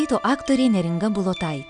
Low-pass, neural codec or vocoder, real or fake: 9.9 kHz; none; real